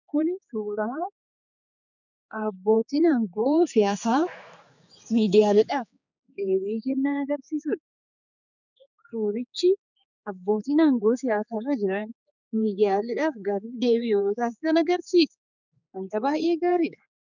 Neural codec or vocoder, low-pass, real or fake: codec, 16 kHz, 4 kbps, X-Codec, HuBERT features, trained on general audio; 7.2 kHz; fake